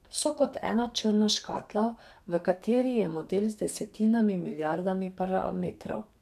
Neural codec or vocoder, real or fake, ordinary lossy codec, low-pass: codec, 32 kHz, 1.9 kbps, SNAC; fake; none; 14.4 kHz